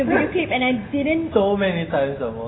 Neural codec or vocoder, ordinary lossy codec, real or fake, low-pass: none; AAC, 16 kbps; real; 7.2 kHz